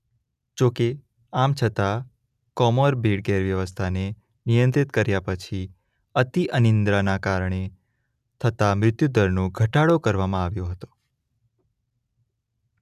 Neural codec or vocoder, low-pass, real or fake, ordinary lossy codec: none; 14.4 kHz; real; none